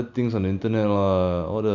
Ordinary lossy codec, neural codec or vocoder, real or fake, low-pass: Opus, 64 kbps; none; real; 7.2 kHz